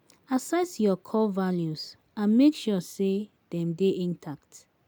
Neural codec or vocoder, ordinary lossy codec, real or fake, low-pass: none; none; real; none